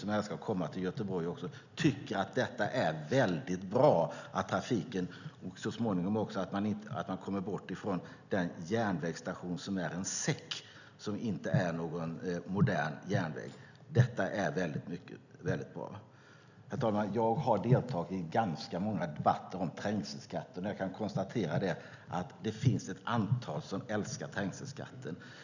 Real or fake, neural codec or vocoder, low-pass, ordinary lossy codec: real; none; 7.2 kHz; none